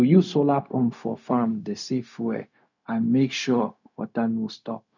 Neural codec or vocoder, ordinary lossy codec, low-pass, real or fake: codec, 16 kHz, 0.4 kbps, LongCat-Audio-Codec; none; 7.2 kHz; fake